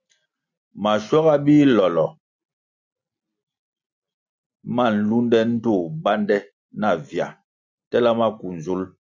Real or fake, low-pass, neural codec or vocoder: real; 7.2 kHz; none